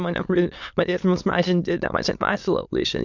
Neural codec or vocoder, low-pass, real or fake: autoencoder, 22.05 kHz, a latent of 192 numbers a frame, VITS, trained on many speakers; 7.2 kHz; fake